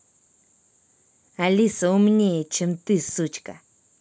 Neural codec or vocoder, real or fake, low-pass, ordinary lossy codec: none; real; none; none